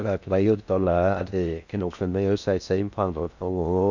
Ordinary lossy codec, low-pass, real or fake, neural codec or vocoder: none; 7.2 kHz; fake; codec, 16 kHz in and 24 kHz out, 0.6 kbps, FocalCodec, streaming, 4096 codes